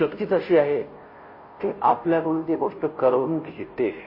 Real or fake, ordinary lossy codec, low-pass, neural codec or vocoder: fake; MP3, 24 kbps; 5.4 kHz; codec, 16 kHz, 0.5 kbps, FunCodec, trained on Chinese and English, 25 frames a second